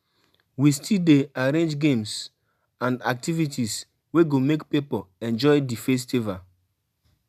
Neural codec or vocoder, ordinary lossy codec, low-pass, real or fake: none; none; 14.4 kHz; real